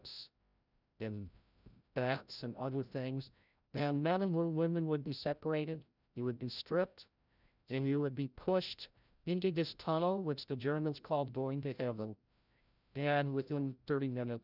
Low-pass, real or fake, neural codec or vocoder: 5.4 kHz; fake; codec, 16 kHz, 0.5 kbps, FreqCodec, larger model